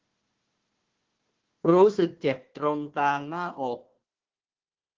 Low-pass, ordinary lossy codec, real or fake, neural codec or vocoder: 7.2 kHz; Opus, 16 kbps; fake; codec, 16 kHz, 1 kbps, FunCodec, trained on Chinese and English, 50 frames a second